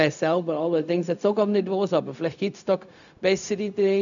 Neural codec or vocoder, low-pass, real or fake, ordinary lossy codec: codec, 16 kHz, 0.4 kbps, LongCat-Audio-Codec; 7.2 kHz; fake; none